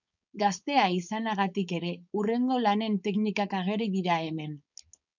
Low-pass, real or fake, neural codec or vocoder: 7.2 kHz; fake; codec, 16 kHz, 4.8 kbps, FACodec